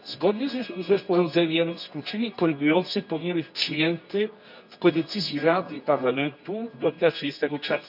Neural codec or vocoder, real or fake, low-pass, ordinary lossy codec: codec, 24 kHz, 0.9 kbps, WavTokenizer, medium music audio release; fake; 5.4 kHz; none